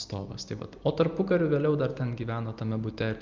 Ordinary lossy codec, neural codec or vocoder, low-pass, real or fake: Opus, 24 kbps; none; 7.2 kHz; real